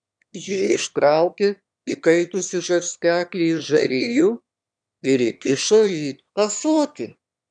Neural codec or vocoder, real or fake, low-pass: autoencoder, 22.05 kHz, a latent of 192 numbers a frame, VITS, trained on one speaker; fake; 9.9 kHz